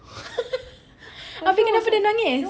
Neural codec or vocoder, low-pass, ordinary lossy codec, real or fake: none; none; none; real